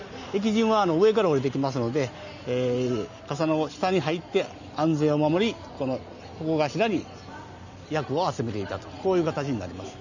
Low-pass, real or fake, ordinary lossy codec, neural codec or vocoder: 7.2 kHz; real; none; none